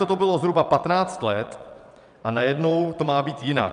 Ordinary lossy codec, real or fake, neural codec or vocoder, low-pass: MP3, 96 kbps; fake; vocoder, 22.05 kHz, 80 mel bands, WaveNeXt; 9.9 kHz